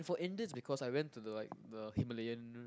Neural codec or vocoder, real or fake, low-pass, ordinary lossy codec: none; real; none; none